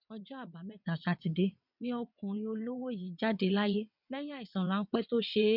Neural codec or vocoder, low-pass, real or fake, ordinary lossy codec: vocoder, 22.05 kHz, 80 mel bands, WaveNeXt; 5.4 kHz; fake; none